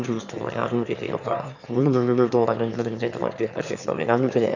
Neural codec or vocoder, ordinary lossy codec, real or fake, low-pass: autoencoder, 22.05 kHz, a latent of 192 numbers a frame, VITS, trained on one speaker; none; fake; 7.2 kHz